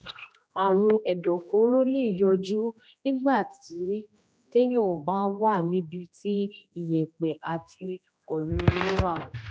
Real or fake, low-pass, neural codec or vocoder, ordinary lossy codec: fake; none; codec, 16 kHz, 1 kbps, X-Codec, HuBERT features, trained on general audio; none